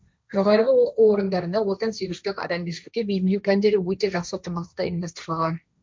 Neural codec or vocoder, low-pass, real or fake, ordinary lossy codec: codec, 16 kHz, 1.1 kbps, Voila-Tokenizer; none; fake; none